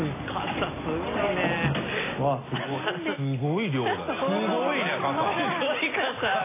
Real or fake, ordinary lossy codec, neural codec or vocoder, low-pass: real; AAC, 16 kbps; none; 3.6 kHz